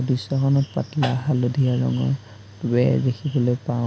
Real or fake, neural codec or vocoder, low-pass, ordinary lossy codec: real; none; none; none